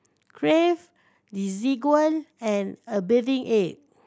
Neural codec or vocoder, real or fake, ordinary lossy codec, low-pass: none; real; none; none